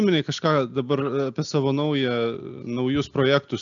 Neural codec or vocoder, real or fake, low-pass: none; real; 7.2 kHz